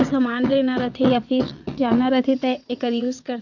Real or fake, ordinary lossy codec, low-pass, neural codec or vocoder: fake; none; 7.2 kHz; vocoder, 22.05 kHz, 80 mel bands, WaveNeXt